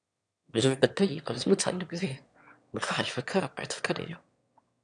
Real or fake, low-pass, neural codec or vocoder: fake; 9.9 kHz; autoencoder, 22.05 kHz, a latent of 192 numbers a frame, VITS, trained on one speaker